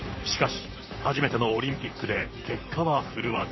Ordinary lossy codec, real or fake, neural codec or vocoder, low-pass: MP3, 24 kbps; fake; vocoder, 44.1 kHz, 128 mel bands, Pupu-Vocoder; 7.2 kHz